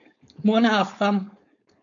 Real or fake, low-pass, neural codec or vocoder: fake; 7.2 kHz; codec, 16 kHz, 4.8 kbps, FACodec